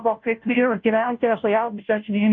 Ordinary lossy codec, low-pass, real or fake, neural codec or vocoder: AAC, 64 kbps; 7.2 kHz; fake; codec, 16 kHz, 0.5 kbps, FunCodec, trained on Chinese and English, 25 frames a second